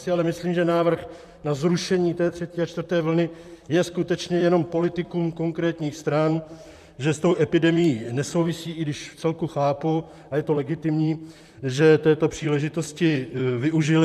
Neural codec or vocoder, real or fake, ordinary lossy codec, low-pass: vocoder, 44.1 kHz, 128 mel bands, Pupu-Vocoder; fake; MP3, 96 kbps; 14.4 kHz